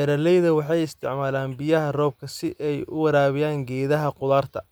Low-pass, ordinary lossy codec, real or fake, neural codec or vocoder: none; none; real; none